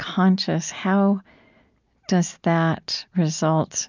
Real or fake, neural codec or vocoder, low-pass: real; none; 7.2 kHz